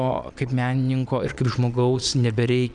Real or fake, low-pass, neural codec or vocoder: fake; 9.9 kHz; vocoder, 22.05 kHz, 80 mel bands, Vocos